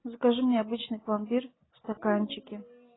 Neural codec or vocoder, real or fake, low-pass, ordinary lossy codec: none; real; 7.2 kHz; AAC, 16 kbps